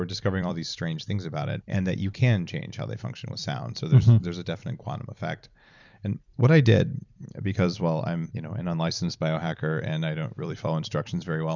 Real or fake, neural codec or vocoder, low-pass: fake; vocoder, 44.1 kHz, 128 mel bands every 256 samples, BigVGAN v2; 7.2 kHz